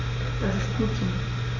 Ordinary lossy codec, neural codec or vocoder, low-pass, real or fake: none; none; 7.2 kHz; real